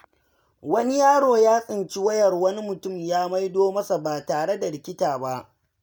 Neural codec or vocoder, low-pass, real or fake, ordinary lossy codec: none; none; real; none